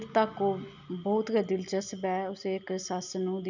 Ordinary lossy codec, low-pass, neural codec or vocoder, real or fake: none; 7.2 kHz; none; real